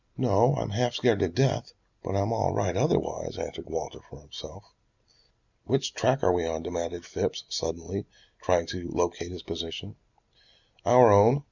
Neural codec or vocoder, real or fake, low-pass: none; real; 7.2 kHz